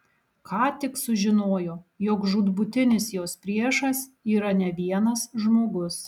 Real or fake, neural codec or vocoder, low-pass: real; none; 19.8 kHz